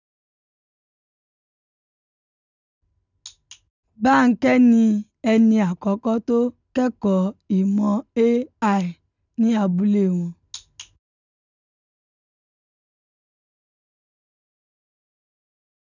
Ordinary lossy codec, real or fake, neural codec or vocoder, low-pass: none; real; none; 7.2 kHz